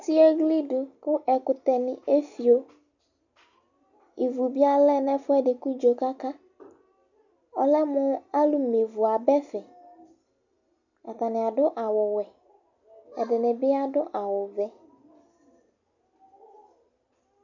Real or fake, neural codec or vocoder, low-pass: real; none; 7.2 kHz